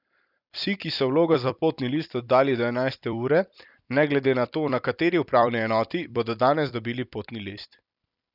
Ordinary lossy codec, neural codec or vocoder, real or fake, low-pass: none; vocoder, 44.1 kHz, 128 mel bands every 256 samples, BigVGAN v2; fake; 5.4 kHz